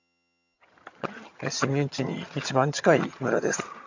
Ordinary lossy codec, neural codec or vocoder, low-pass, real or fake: MP3, 64 kbps; vocoder, 22.05 kHz, 80 mel bands, HiFi-GAN; 7.2 kHz; fake